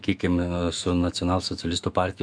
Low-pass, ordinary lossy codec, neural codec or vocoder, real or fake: 9.9 kHz; MP3, 96 kbps; autoencoder, 48 kHz, 128 numbers a frame, DAC-VAE, trained on Japanese speech; fake